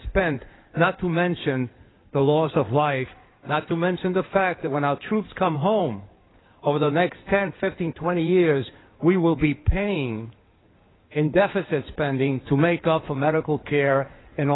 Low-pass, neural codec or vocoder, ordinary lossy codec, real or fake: 7.2 kHz; codec, 16 kHz in and 24 kHz out, 2.2 kbps, FireRedTTS-2 codec; AAC, 16 kbps; fake